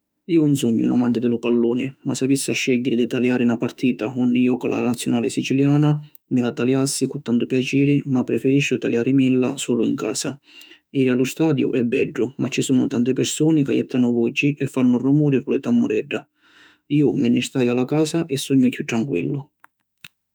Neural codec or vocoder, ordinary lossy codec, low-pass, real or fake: autoencoder, 48 kHz, 32 numbers a frame, DAC-VAE, trained on Japanese speech; none; none; fake